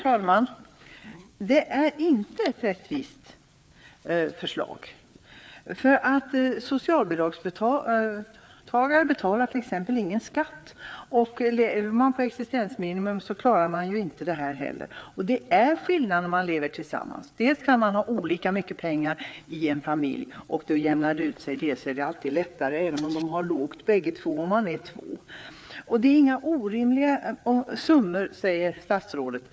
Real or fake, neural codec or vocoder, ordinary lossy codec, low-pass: fake; codec, 16 kHz, 4 kbps, FreqCodec, larger model; none; none